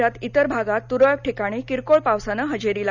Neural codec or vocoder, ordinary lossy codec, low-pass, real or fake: none; none; 7.2 kHz; real